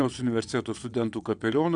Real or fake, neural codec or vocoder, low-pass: fake; vocoder, 22.05 kHz, 80 mel bands, WaveNeXt; 9.9 kHz